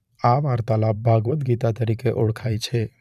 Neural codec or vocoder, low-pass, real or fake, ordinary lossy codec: vocoder, 44.1 kHz, 128 mel bands every 512 samples, BigVGAN v2; 14.4 kHz; fake; none